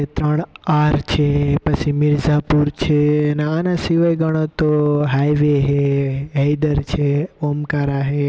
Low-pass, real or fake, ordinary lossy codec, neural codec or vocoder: none; real; none; none